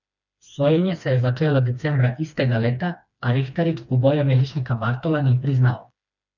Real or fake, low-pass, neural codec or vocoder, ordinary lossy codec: fake; 7.2 kHz; codec, 16 kHz, 2 kbps, FreqCodec, smaller model; none